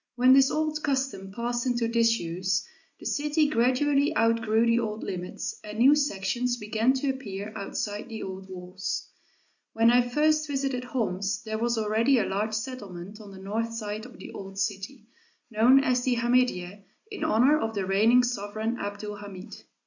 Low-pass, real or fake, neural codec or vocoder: 7.2 kHz; real; none